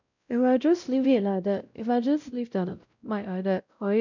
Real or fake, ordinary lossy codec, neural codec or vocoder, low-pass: fake; none; codec, 16 kHz, 0.5 kbps, X-Codec, WavLM features, trained on Multilingual LibriSpeech; 7.2 kHz